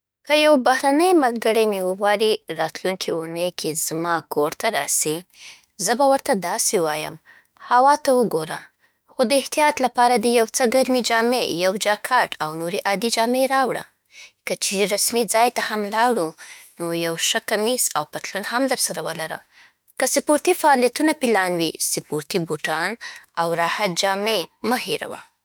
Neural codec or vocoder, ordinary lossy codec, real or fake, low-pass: autoencoder, 48 kHz, 32 numbers a frame, DAC-VAE, trained on Japanese speech; none; fake; none